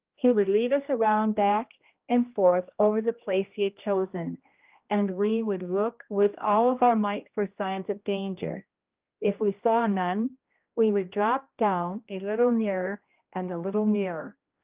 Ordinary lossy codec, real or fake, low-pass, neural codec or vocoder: Opus, 24 kbps; fake; 3.6 kHz; codec, 16 kHz, 1 kbps, X-Codec, HuBERT features, trained on general audio